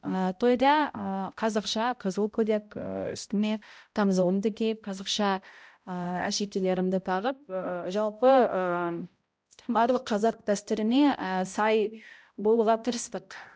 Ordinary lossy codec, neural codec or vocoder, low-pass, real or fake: none; codec, 16 kHz, 0.5 kbps, X-Codec, HuBERT features, trained on balanced general audio; none; fake